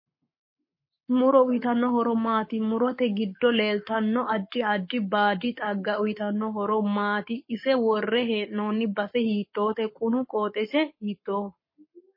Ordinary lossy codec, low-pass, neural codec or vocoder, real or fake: MP3, 24 kbps; 5.4 kHz; codec, 44.1 kHz, 7.8 kbps, Pupu-Codec; fake